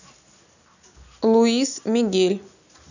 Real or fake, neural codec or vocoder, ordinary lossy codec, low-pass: real; none; none; 7.2 kHz